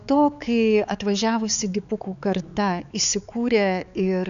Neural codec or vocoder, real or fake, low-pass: codec, 16 kHz, 4 kbps, X-Codec, HuBERT features, trained on balanced general audio; fake; 7.2 kHz